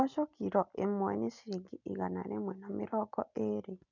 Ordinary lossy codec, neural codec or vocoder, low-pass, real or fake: none; none; 7.2 kHz; real